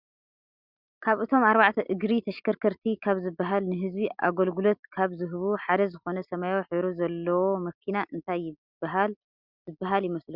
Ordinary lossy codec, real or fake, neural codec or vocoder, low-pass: Opus, 64 kbps; real; none; 5.4 kHz